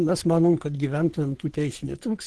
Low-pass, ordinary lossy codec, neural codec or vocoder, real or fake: 10.8 kHz; Opus, 16 kbps; codec, 44.1 kHz, 3.4 kbps, Pupu-Codec; fake